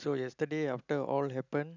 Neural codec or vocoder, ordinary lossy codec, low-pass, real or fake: none; none; 7.2 kHz; real